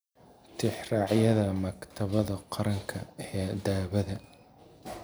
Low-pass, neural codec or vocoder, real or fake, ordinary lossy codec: none; none; real; none